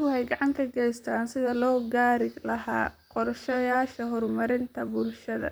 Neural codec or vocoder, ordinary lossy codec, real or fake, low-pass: vocoder, 44.1 kHz, 128 mel bands, Pupu-Vocoder; none; fake; none